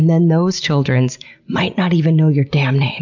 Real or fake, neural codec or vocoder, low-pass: real; none; 7.2 kHz